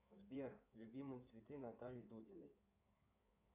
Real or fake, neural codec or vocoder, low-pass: fake; codec, 16 kHz in and 24 kHz out, 2.2 kbps, FireRedTTS-2 codec; 3.6 kHz